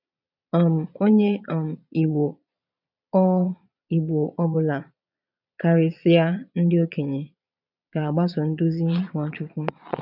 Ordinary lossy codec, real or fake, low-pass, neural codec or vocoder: none; fake; 5.4 kHz; vocoder, 22.05 kHz, 80 mel bands, Vocos